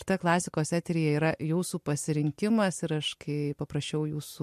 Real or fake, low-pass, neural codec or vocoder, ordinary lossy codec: real; 14.4 kHz; none; MP3, 64 kbps